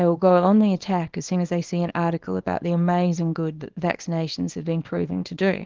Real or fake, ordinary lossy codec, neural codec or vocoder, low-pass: fake; Opus, 16 kbps; codec, 24 kHz, 0.9 kbps, WavTokenizer, small release; 7.2 kHz